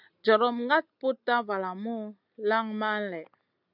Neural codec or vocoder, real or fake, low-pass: none; real; 5.4 kHz